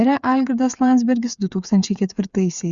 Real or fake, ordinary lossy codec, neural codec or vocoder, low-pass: fake; Opus, 64 kbps; codec, 16 kHz, 8 kbps, FreqCodec, smaller model; 7.2 kHz